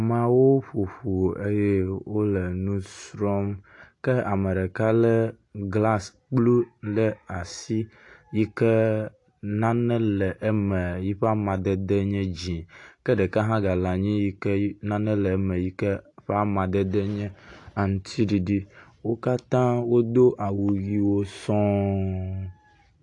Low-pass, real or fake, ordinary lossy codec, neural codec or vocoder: 10.8 kHz; real; AAC, 48 kbps; none